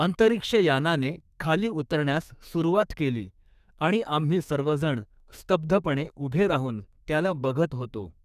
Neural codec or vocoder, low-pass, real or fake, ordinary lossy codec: codec, 32 kHz, 1.9 kbps, SNAC; 14.4 kHz; fake; none